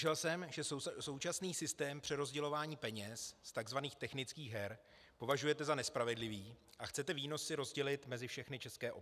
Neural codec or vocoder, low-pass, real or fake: none; 14.4 kHz; real